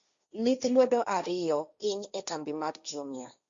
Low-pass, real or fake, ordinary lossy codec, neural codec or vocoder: 7.2 kHz; fake; Opus, 64 kbps; codec, 16 kHz, 1.1 kbps, Voila-Tokenizer